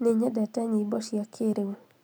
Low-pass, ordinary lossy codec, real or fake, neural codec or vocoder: none; none; real; none